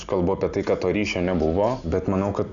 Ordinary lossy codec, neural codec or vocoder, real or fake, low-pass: MP3, 96 kbps; none; real; 7.2 kHz